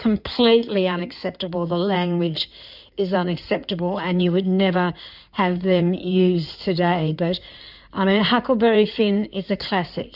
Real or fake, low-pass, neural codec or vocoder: fake; 5.4 kHz; codec, 16 kHz in and 24 kHz out, 2.2 kbps, FireRedTTS-2 codec